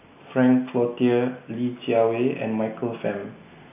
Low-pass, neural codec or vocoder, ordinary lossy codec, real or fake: 3.6 kHz; none; AAC, 32 kbps; real